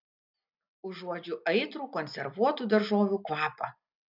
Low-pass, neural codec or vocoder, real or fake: 5.4 kHz; none; real